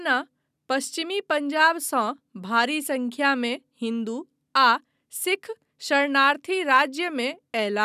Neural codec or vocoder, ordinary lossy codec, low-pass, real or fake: none; none; 14.4 kHz; real